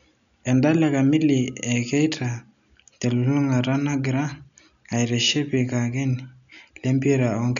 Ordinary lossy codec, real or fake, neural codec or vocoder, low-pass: none; real; none; 7.2 kHz